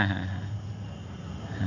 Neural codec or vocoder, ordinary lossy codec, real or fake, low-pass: none; none; real; 7.2 kHz